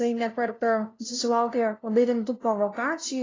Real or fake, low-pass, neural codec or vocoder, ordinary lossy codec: fake; 7.2 kHz; codec, 16 kHz, 0.5 kbps, FunCodec, trained on LibriTTS, 25 frames a second; AAC, 32 kbps